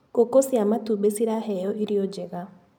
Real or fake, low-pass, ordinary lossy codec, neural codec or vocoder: real; none; none; none